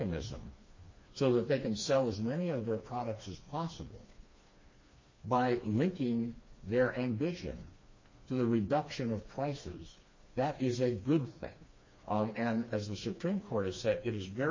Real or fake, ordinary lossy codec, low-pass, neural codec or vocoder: fake; MP3, 32 kbps; 7.2 kHz; codec, 16 kHz, 2 kbps, FreqCodec, smaller model